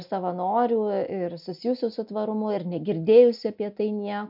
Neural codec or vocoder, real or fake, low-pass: none; real; 5.4 kHz